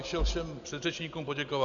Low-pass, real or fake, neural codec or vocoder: 7.2 kHz; real; none